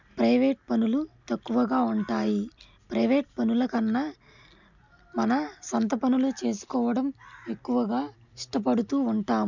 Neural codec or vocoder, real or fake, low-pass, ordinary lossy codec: none; real; 7.2 kHz; none